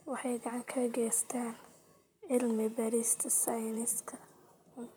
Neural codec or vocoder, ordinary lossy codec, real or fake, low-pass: vocoder, 44.1 kHz, 128 mel bands, Pupu-Vocoder; none; fake; none